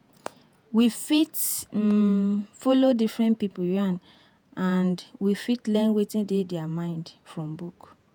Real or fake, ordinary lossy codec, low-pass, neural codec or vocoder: fake; none; none; vocoder, 48 kHz, 128 mel bands, Vocos